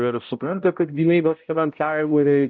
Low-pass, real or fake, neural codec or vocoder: 7.2 kHz; fake; codec, 16 kHz, 0.5 kbps, X-Codec, HuBERT features, trained on balanced general audio